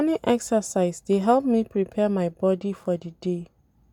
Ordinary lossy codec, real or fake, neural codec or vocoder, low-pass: none; real; none; 19.8 kHz